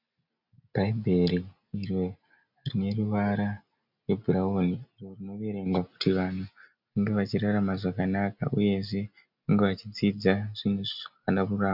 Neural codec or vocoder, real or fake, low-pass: none; real; 5.4 kHz